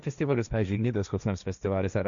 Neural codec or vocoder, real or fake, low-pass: codec, 16 kHz, 1.1 kbps, Voila-Tokenizer; fake; 7.2 kHz